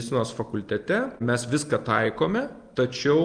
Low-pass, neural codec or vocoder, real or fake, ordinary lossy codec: 9.9 kHz; none; real; Opus, 32 kbps